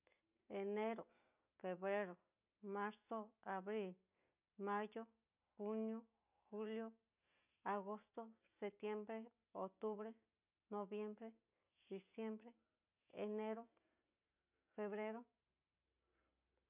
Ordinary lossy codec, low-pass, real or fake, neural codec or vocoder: none; 3.6 kHz; real; none